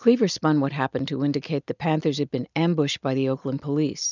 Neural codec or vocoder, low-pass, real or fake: none; 7.2 kHz; real